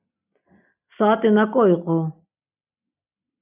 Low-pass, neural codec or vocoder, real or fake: 3.6 kHz; none; real